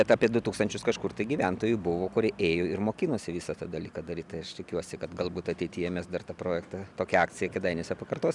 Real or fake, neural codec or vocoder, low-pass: real; none; 10.8 kHz